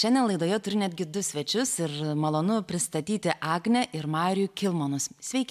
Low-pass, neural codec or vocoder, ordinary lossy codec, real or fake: 14.4 kHz; none; MP3, 96 kbps; real